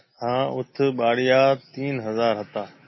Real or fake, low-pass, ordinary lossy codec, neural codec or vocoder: real; 7.2 kHz; MP3, 24 kbps; none